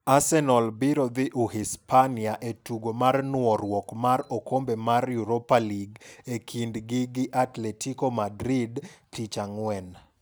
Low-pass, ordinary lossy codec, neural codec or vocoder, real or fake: none; none; none; real